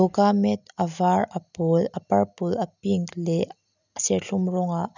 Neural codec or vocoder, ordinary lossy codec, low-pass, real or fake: none; none; 7.2 kHz; real